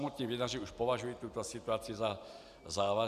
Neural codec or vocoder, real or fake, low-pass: none; real; 14.4 kHz